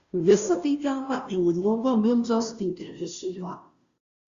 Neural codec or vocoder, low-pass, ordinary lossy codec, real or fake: codec, 16 kHz, 0.5 kbps, FunCodec, trained on Chinese and English, 25 frames a second; 7.2 kHz; Opus, 64 kbps; fake